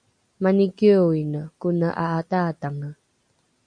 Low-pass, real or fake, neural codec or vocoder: 9.9 kHz; real; none